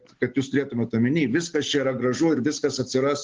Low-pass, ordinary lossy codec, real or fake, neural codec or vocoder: 7.2 kHz; Opus, 16 kbps; real; none